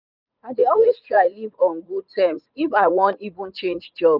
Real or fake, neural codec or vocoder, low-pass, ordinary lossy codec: fake; vocoder, 44.1 kHz, 80 mel bands, Vocos; 5.4 kHz; none